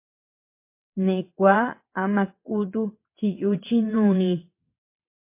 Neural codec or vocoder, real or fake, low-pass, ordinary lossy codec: vocoder, 22.05 kHz, 80 mel bands, WaveNeXt; fake; 3.6 kHz; MP3, 24 kbps